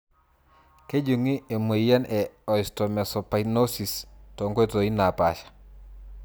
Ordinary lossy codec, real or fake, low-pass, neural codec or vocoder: none; real; none; none